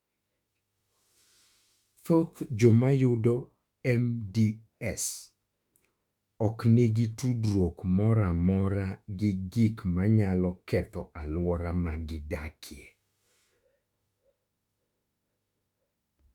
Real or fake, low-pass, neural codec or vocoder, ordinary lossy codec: fake; 19.8 kHz; autoencoder, 48 kHz, 32 numbers a frame, DAC-VAE, trained on Japanese speech; Opus, 64 kbps